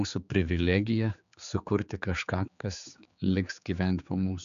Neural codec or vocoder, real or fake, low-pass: codec, 16 kHz, 4 kbps, X-Codec, HuBERT features, trained on general audio; fake; 7.2 kHz